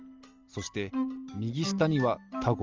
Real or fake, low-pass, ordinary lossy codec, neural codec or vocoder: real; 7.2 kHz; Opus, 32 kbps; none